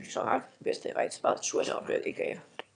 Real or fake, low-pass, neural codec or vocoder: fake; 9.9 kHz; autoencoder, 22.05 kHz, a latent of 192 numbers a frame, VITS, trained on one speaker